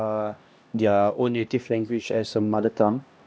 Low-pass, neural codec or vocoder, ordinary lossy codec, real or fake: none; codec, 16 kHz, 1 kbps, X-Codec, HuBERT features, trained on LibriSpeech; none; fake